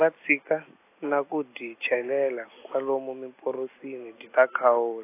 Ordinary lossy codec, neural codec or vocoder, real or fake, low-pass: AAC, 24 kbps; none; real; 3.6 kHz